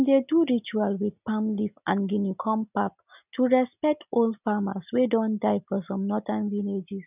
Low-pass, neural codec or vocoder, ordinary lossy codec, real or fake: 3.6 kHz; none; none; real